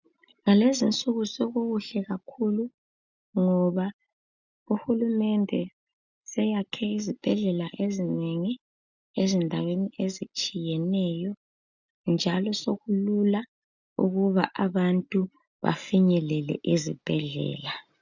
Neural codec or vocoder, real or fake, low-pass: none; real; 7.2 kHz